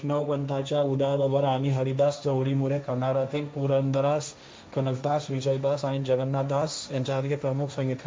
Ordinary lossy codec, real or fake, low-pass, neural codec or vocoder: none; fake; none; codec, 16 kHz, 1.1 kbps, Voila-Tokenizer